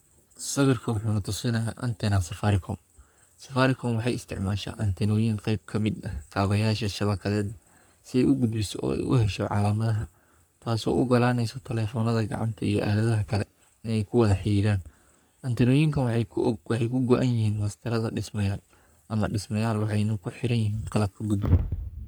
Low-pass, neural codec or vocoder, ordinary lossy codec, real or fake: none; codec, 44.1 kHz, 3.4 kbps, Pupu-Codec; none; fake